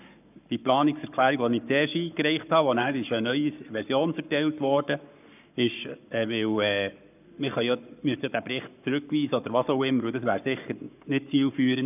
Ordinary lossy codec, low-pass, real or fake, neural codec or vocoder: none; 3.6 kHz; fake; codec, 44.1 kHz, 7.8 kbps, Pupu-Codec